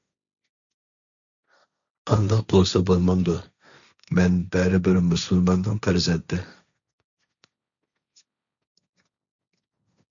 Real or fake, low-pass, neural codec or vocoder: fake; 7.2 kHz; codec, 16 kHz, 1.1 kbps, Voila-Tokenizer